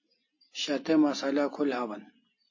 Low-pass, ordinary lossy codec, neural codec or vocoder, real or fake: 7.2 kHz; MP3, 32 kbps; none; real